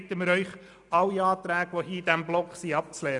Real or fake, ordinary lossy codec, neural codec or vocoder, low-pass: real; none; none; 10.8 kHz